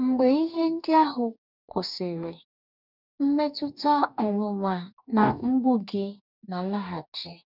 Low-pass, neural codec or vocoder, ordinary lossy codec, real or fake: 5.4 kHz; codec, 44.1 kHz, 2.6 kbps, DAC; none; fake